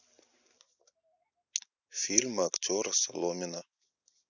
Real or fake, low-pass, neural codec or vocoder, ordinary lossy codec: real; 7.2 kHz; none; none